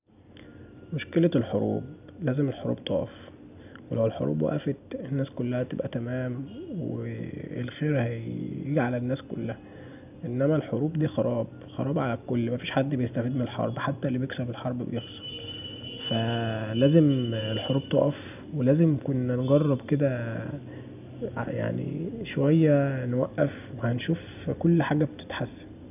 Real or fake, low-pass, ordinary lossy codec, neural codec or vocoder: real; 3.6 kHz; none; none